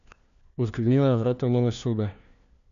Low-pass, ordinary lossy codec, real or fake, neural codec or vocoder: 7.2 kHz; none; fake; codec, 16 kHz, 1 kbps, FunCodec, trained on LibriTTS, 50 frames a second